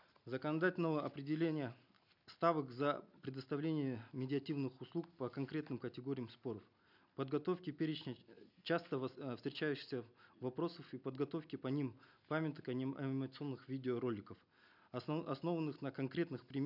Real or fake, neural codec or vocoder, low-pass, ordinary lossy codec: real; none; 5.4 kHz; none